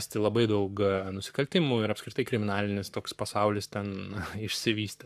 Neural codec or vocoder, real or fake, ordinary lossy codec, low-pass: codec, 44.1 kHz, 7.8 kbps, Pupu-Codec; fake; AAC, 96 kbps; 14.4 kHz